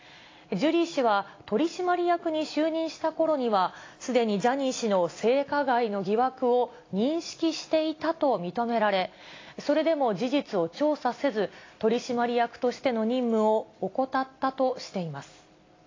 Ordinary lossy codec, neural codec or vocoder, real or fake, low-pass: AAC, 32 kbps; none; real; 7.2 kHz